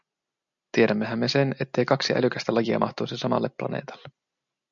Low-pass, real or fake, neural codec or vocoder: 7.2 kHz; real; none